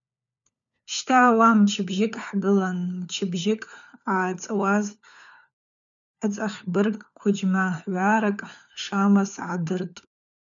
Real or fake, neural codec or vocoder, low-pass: fake; codec, 16 kHz, 4 kbps, FunCodec, trained on LibriTTS, 50 frames a second; 7.2 kHz